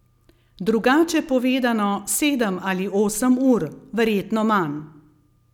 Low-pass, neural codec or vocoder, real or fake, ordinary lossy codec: 19.8 kHz; none; real; none